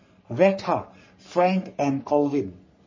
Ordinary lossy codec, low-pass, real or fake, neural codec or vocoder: MP3, 32 kbps; 7.2 kHz; fake; codec, 44.1 kHz, 3.4 kbps, Pupu-Codec